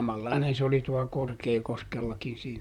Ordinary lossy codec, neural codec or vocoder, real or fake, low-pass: none; vocoder, 44.1 kHz, 128 mel bands, Pupu-Vocoder; fake; 19.8 kHz